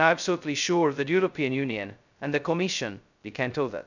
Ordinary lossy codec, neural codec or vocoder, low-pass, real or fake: none; codec, 16 kHz, 0.2 kbps, FocalCodec; 7.2 kHz; fake